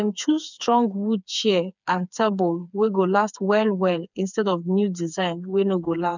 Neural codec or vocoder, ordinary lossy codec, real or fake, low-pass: codec, 16 kHz, 4 kbps, FreqCodec, smaller model; none; fake; 7.2 kHz